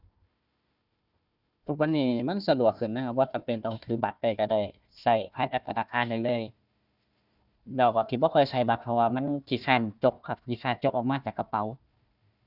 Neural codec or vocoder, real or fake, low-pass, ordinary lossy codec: codec, 16 kHz, 1 kbps, FunCodec, trained on Chinese and English, 50 frames a second; fake; 5.4 kHz; none